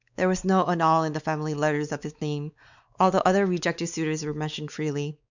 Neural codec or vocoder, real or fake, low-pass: codec, 16 kHz, 4 kbps, X-Codec, WavLM features, trained on Multilingual LibriSpeech; fake; 7.2 kHz